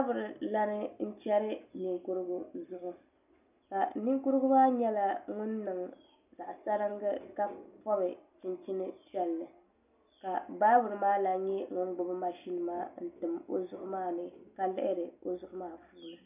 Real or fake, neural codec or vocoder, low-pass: real; none; 3.6 kHz